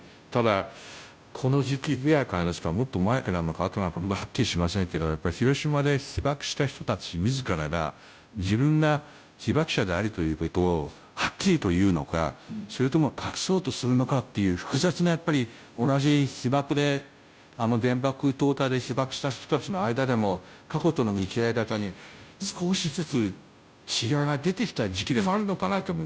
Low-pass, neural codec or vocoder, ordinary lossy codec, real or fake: none; codec, 16 kHz, 0.5 kbps, FunCodec, trained on Chinese and English, 25 frames a second; none; fake